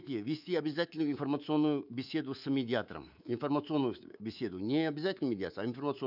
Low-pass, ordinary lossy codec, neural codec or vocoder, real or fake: 5.4 kHz; none; codec, 24 kHz, 3.1 kbps, DualCodec; fake